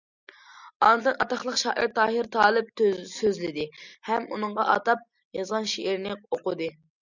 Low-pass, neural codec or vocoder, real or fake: 7.2 kHz; none; real